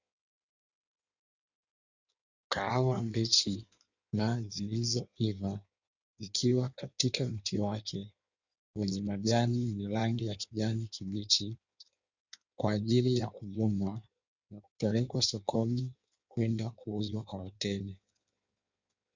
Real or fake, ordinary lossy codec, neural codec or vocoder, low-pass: fake; Opus, 64 kbps; codec, 16 kHz in and 24 kHz out, 1.1 kbps, FireRedTTS-2 codec; 7.2 kHz